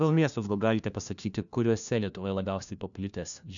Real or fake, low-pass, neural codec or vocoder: fake; 7.2 kHz; codec, 16 kHz, 1 kbps, FunCodec, trained on LibriTTS, 50 frames a second